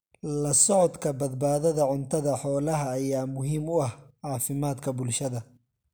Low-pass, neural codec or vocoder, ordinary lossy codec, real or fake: none; none; none; real